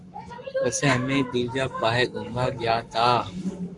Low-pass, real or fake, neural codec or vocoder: 10.8 kHz; fake; codec, 44.1 kHz, 7.8 kbps, Pupu-Codec